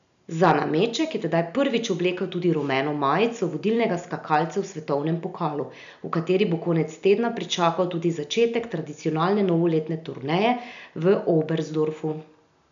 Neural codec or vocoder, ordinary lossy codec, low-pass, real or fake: none; AAC, 96 kbps; 7.2 kHz; real